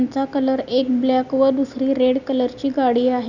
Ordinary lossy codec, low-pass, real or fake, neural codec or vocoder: none; 7.2 kHz; real; none